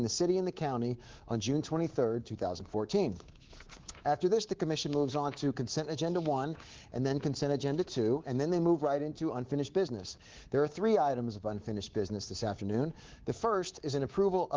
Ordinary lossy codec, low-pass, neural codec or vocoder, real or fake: Opus, 16 kbps; 7.2 kHz; codec, 24 kHz, 3.1 kbps, DualCodec; fake